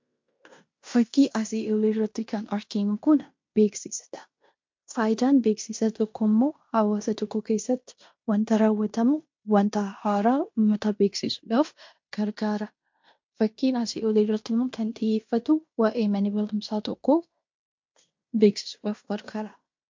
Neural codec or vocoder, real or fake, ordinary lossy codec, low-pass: codec, 16 kHz in and 24 kHz out, 0.9 kbps, LongCat-Audio-Codec, fine tuned four codebook decoder; fake; MP3, 48 kbps; 7.2 kHz